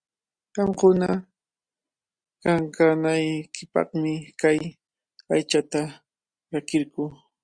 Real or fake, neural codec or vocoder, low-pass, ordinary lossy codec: real; none; 9.9 kHz; Opus, 64 kbps